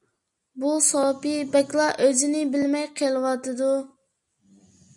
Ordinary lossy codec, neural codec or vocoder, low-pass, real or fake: MP3, 96 kbps; none; 10.8 kHz; real